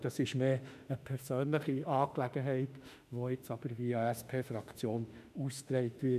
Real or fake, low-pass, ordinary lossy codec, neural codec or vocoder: fake; 14.4 kHz; none; autoencoder, 48 kHz, 32 numbers a frame, DAC-VAE, trained on Japanese speech